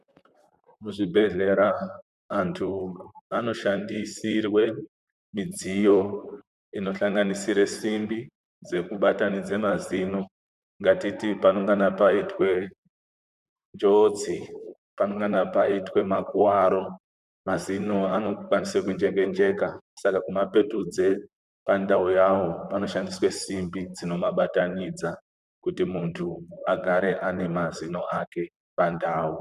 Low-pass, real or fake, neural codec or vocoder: 14.4 kHz; fake; vocoder, 44.1 kHz, 128 mel bands, Pupu-Vocoder